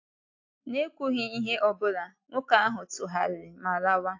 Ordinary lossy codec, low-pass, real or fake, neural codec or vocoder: AAC, 48 kbps; 7.2 kHz; real; none